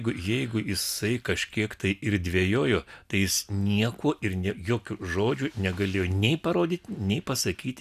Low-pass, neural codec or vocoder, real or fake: 14.4 kHz; none; real